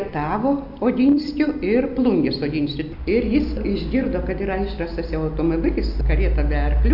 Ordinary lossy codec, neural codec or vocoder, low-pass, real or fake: AAC, 48 kbps; none; 5.4 kHz; real